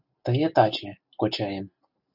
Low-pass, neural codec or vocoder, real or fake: 5.4 kHz; none; real